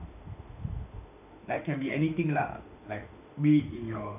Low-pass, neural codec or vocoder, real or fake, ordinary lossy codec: 3.6 kHz; autoencoder, 48 kHz, 32 numbers a frame, DAC-VAE, trained on Japanese speech; fake; none